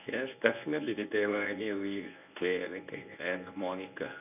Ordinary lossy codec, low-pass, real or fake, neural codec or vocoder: none; 3.6 kHz; fake; codec, 24 kHz, 0.9 kbps, WavTokenizer, medium speech release version 1